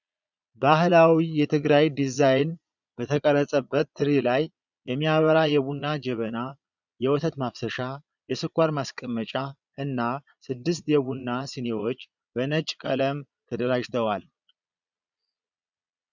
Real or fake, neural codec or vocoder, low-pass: fake; vocoder, 22.05 kHz, 80 mel bands, Vocos; 7.2 kHz